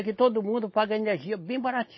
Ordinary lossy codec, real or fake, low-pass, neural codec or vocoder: MP3, 24 kbps; real; 7.2 kHz; none